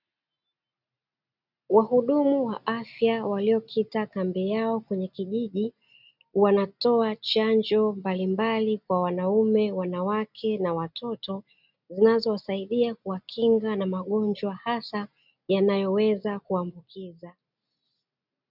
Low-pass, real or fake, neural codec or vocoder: 5.4 kHz; real; none